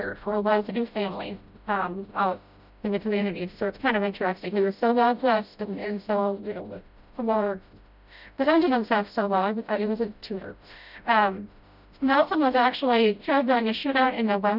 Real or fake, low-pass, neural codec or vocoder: fake; 5.4 kHz; codec, 16 kHz, 0.5 kbps, FreqCodec, smaller model